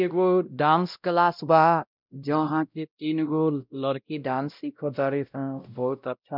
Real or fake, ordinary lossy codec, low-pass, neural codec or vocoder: fake; none; 5.4 kHz; codec, 16 kHz, 0.5 kbps, X-Codec, WavLM features, trained on Multilingual LibriSpeech